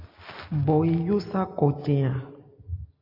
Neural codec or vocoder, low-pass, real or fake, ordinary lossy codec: none; 5.4 kHz; real; AAC, 32 kbps